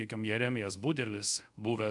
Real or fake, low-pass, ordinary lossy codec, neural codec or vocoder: fake; 10.8 kHz; MP3, 96 kbps; codec, 24 kHz, 0.5 kbps, DualCodec